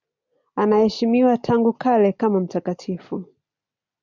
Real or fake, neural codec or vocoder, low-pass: real; none; 7.2 kHz